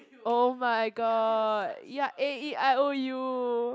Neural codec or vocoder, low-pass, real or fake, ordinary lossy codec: none; none; real; none